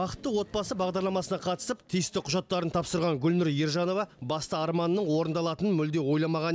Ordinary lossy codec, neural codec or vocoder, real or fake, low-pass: none; none; real; none